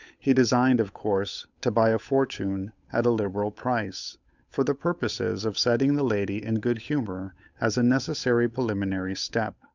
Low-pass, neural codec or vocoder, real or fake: 7.2 kHz; codec, 16 kHz, 8 kbps, FunCodec, trained on Chinese and English, 25 frames a second; fake